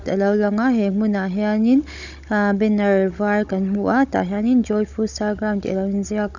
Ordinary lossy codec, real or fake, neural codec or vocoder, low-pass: none; fake; codec, 16 kHz, 16 kbps, FunCodec, trained on Chinese and English, 50 frames a second; 7.2 kHz